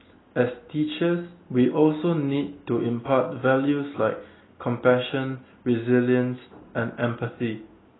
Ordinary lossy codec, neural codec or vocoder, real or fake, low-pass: AAC, 16 kbps; none; real; 7.2 kHz